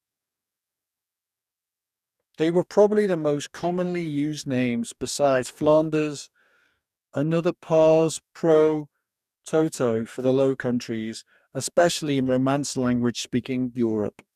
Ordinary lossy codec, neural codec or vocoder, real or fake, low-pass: none; codec, 44.1 kHz, 2.6 kbps, DAC; fake; 14.4 kHz